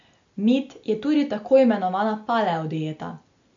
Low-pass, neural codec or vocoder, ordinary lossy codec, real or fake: 7.2 kHz; none; MP3, 64 kbps; real